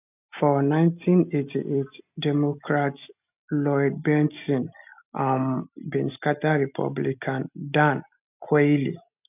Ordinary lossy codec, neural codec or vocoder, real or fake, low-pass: none; none; real; 3.6 kHz